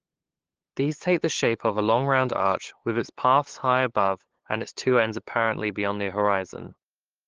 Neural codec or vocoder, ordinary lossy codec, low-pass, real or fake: codec, 16 kHz, 8 kbps, FunCodec, trained on LibriTTS, 25 frames a second; Opus, 16 kbps; 7.2 kHz; fake